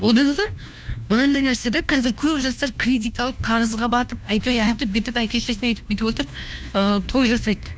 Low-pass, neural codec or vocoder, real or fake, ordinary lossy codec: none; codec, 16 kHz, 1 kbps, FunCodec, trained on LibriTTS, 50 frames a second; fake; none